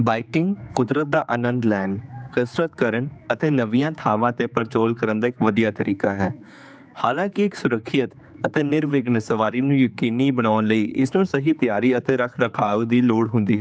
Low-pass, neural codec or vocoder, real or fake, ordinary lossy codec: none; codec, 16 kHz, 4 kbps, X-Codec, HuBERT features, trained on general audio; fake; none